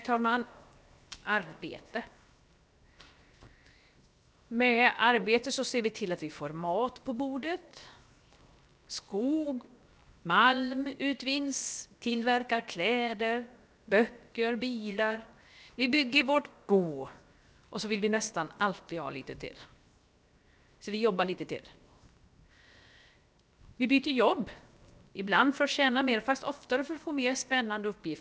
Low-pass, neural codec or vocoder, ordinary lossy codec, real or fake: none; codec, 16 kHz, 0.7 kbps, FocalCodec; none; fake